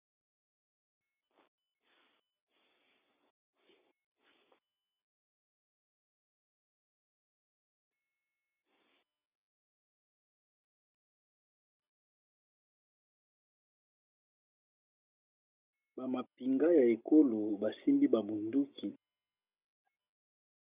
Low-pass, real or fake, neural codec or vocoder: 3.6 kHz; real; none